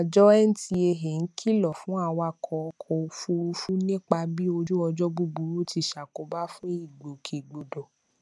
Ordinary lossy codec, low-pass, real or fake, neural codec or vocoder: none; none; real; none